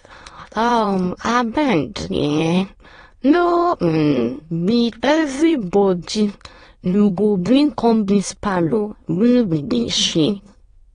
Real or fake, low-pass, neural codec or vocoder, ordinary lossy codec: fake; 9.9 kHz; autoencoder, 22.05 kHz, a latent of 192 numbers a frame, VITS, trained on many speakers; AAC, 32 kbps